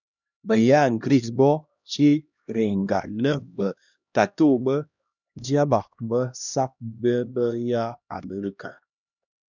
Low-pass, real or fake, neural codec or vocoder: 7.2 kHz; fake; codec, 16 kHz, 1 kbps, X-Codec, HuBERT features, trained on LibriSpeech